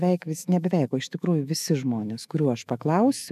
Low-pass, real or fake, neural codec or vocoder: 14.4 kHz; fake; autoencoder, 48 kHz, 128 numbers a frame, DAC-VAE, trained on Japanese speech